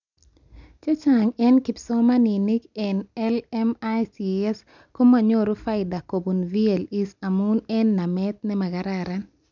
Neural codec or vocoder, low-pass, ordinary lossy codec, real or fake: none; 7.2 kHz; none; real